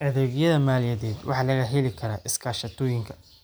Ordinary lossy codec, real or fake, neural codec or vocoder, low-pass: none; real; none; none